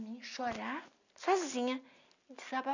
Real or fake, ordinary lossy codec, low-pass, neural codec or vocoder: real; none; 7.2 kHz; none